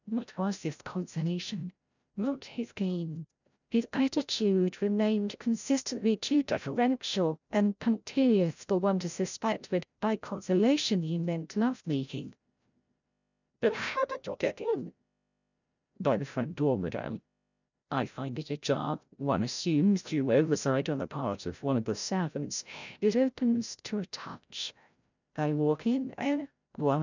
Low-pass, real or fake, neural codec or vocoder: 7.2 kHz; fake; codec, 16 kHz, 0.5 kbps, FreqCodec, larger model